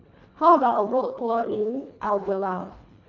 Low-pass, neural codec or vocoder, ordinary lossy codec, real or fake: 7.2 kHz; codec, 24 kHz, 1.5 kbps, HILCodec; none; fake